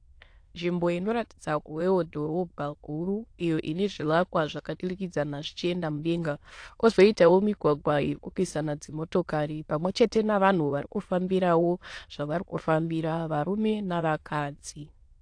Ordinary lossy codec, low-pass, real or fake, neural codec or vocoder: AAC, 48 kbps; 9.9 kHz; fake; autoencoder, 22.05 kHz, a latent of 192 numbers a frame, VITS, trained on many speakers